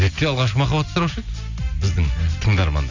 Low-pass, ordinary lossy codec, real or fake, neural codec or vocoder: 7.2 kHz; Opus, 64 kbps; real; none